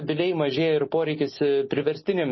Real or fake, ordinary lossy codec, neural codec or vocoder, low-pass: real; MP3, 24 kbps; none; 7.2 kHz